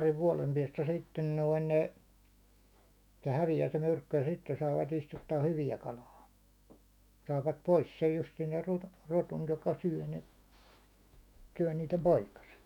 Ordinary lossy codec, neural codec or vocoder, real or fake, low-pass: none; autoencoder, 48 kHz, 128 numbers a frame, DAC-VAE, trained on Japanese speech; fake; 19.8 kHz